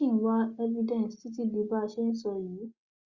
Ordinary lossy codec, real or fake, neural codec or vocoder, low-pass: Opus, 64 kbps; real; none; 7.2 kHz